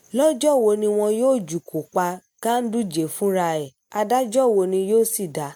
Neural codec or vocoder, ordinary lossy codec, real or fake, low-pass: none; MP3, 96 kbps; real; 19.8 kHz